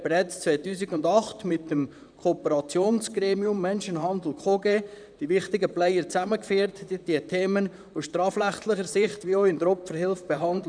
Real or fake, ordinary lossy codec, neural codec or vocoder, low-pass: fake; none; vocoder, 44.1 kHz, 128 mel bands, Pupu-Vocoder; 9.9 kHz